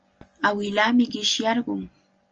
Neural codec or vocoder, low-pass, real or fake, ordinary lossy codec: none; 7.2 kHz; real; Opus, 24 kbps